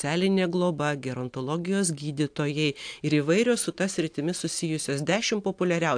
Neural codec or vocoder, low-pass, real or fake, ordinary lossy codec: none; 9.9 kHz; real; AAC, 64 kbps